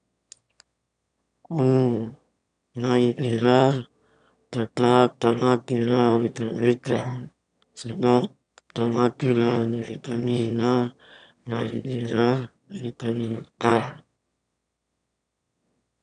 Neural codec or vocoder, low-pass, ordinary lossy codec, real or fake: autoencoder, 22.05 kHz, a latent of 192 numbers a frame, VITS, trained on one speaker; 9.9 kHz; none; fake